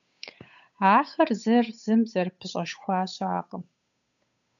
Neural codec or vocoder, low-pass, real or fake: codec, 16 kHz, 8 kbps, FunCodec, trained on Chinese and English, 25 frames a second; 7.2 kHz; fake